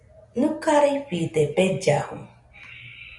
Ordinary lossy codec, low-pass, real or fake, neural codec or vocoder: MP3, 96 kbps; 10.8 kHz; real; none